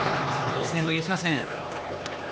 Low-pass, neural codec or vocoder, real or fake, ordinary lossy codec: none; codec, 16 kHz, 2 kbps, X-Codec, HuBERT features, trained on LibriSpeech; fake; none